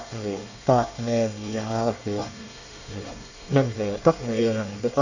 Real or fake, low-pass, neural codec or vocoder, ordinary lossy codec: fake; 7.2 kHz; codec, 24 kHz, 1 kbps, SNAC; none